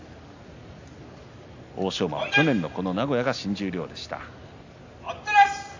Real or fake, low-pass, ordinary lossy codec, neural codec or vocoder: real; 7.2 kHz; AAC, 48 kbps; none